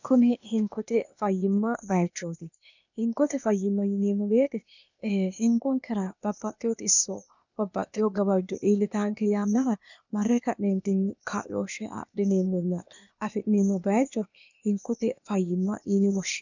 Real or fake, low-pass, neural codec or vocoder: fake; 7.2 kHz; codec, 16 kHz, 0.8 kbps, ZipCodec